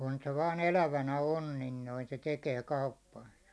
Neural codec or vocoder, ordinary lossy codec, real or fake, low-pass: none; none; real; none